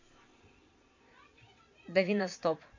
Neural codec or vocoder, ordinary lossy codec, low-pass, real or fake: vocoder, 22.05 kHz, 80 mel bands, Vocos; MP3, 48 kbps; 7.2 kHz; fake